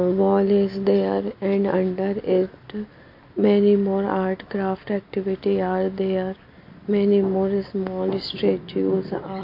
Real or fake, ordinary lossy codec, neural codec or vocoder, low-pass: real; MP3, 32 kbps; none; 5.4 kHz